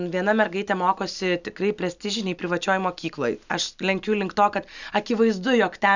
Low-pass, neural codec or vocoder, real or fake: 7.2 kHz; none; real